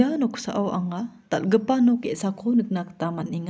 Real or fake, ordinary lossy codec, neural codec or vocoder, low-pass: real; none; none; none